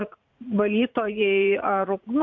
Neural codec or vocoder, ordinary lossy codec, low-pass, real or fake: vocoder, 44.1 kHz, 80 mel bands, Vocos; MP3, 48 kbps; 7.2 kHz; fake